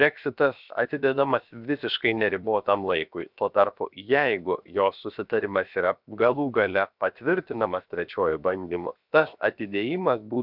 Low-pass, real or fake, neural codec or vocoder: 5.4 kHz; fake; codec, 16 kHz, 0.7 kbps, FocalCodec